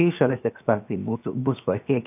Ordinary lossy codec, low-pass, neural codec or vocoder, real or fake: AAC, 32 kbps; 3.6 kHz; codec, 16 kHz, 0.7 kbps, FocalCodec; fake